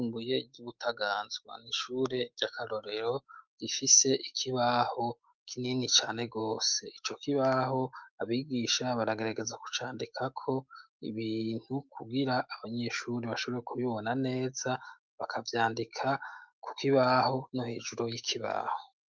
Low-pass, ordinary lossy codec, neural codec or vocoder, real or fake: 7.2 kHz; Opus, 32 kbps; autoencoder, 48 kHz, 128 numbers a frame, DAC-VAE, trained on Japanese speech; fake